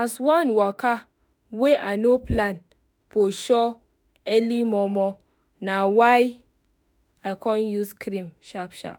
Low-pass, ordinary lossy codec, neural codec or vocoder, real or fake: none; none; autoencoder, 48 kHz, 32 numbers a frame, DAC-VAE, trained on Japanese speech; fake